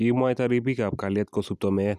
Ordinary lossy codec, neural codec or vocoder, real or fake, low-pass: none; none; real; 14.4 kHz